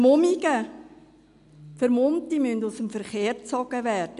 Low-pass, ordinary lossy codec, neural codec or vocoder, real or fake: 10.8 kHz; MP3, 64 kbps; none; real